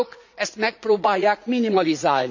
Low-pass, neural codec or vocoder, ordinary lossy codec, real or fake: 7.2 kHz; vocoder, 44.1 kHz, 80 mel bands, Vocos; none; fake